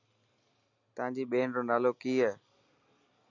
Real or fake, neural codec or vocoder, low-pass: real; none; 7.2 kHz